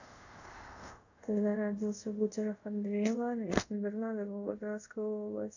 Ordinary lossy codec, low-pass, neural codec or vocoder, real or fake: none; 7.2 kHz; codec, 24 kHz, 0.5 kbps, DualCodec; fake